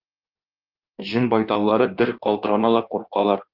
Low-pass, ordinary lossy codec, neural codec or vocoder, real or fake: 5.4 kHz; Opus, 32 kbps; codec, 16 kHz in and 24 kHz out, 1.1 kbps, FireRedTTS-2 codec; fake